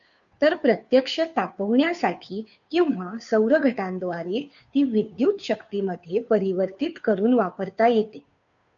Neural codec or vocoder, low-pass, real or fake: codec, 16 kHz, 2 kbps, FunCodec, trained on Chinese and English, 25 frames a second; 7.2 kHz; fake